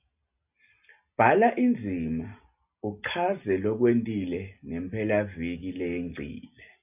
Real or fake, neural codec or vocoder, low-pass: real; none; 3.6 kHz